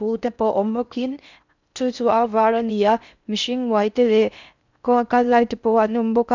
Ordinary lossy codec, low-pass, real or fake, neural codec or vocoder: none; 7.2 kHz; fake; codec, 16 kHz in and 24 kHz out, 0.6 kbps, FocalCodec, streaming, 4096 codes